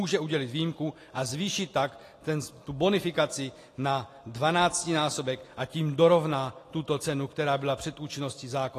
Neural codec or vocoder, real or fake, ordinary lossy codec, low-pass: none; real; AAC, 48 kbps; 14.4 kHz